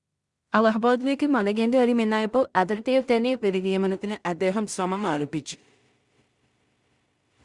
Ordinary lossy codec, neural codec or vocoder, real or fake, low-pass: Opus, 64 kbps; codec, 16 kHz in and 24 kHz out, 0.4 kbps, LongCat-Audio-Codec, two codebook decoder; fake; 10.8 kHz